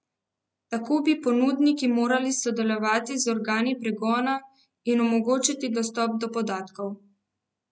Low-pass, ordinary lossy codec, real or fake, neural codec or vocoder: none; none; real; none